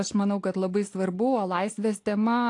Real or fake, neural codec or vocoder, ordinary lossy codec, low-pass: real; none; AAC, 48 kbps; 10.8 kHz